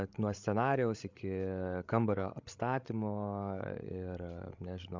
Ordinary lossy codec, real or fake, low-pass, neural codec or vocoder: MP3, 64 kbps; fake; 7.2 kHz; codec, 16 kHz, 16 kbps, FreqCodec, larger model